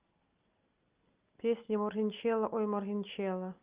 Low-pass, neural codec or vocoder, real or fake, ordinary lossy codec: 3.6 kHz; none; real; Opus, 24 kbps